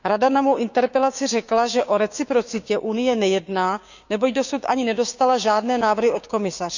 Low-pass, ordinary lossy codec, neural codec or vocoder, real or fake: 7.2 kHz; none; codec, 16 kHz, 6 kbps, DAC; fake